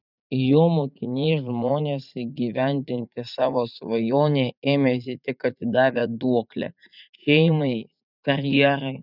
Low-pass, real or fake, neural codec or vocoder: 5.4 kHz; fake; vocoder, 44.1 kHz, 80 mel bands, Vocos